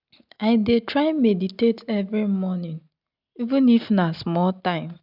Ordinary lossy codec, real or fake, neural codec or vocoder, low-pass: none; real; none; 5.4 kHz